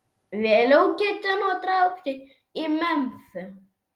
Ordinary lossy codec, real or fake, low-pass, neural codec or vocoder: Opus, 24 kbps; real; 19.8 kHz; none